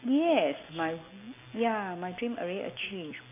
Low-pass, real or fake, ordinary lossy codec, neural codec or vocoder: 3.6 kHz; real; AAC, 24 kbps; none